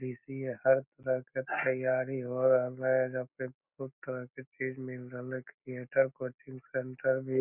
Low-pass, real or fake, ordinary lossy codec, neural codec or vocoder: 3.6 kHz; real; none; none